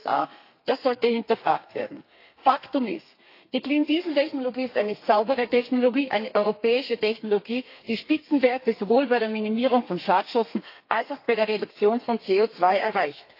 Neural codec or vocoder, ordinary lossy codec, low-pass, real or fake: codec, 32 kHz, 1.9 kbps, SNAC; AAC, 32 kbps; 5.4 kHz; fake